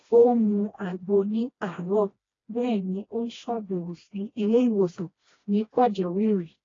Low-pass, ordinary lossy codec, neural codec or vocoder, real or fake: 7.2 kHz; AAC, 32 kbps; codec, 16 kHz, 1 kbps, FreqCodec, smaller model; fake